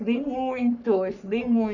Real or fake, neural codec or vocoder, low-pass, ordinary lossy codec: fake; codec, 44.1 kHz, 3.4 kbps, Pupu-Codec; 7.2 kHz; none